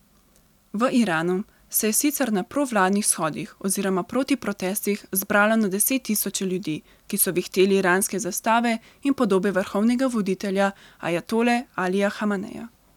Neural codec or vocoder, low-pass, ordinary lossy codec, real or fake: none; 19.8 kHz; none; real